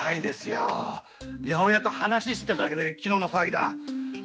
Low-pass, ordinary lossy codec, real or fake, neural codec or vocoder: none; none; fake; codec, 16 kHz, 2 kbps, X-Codec, HuBERT features, trained on general audio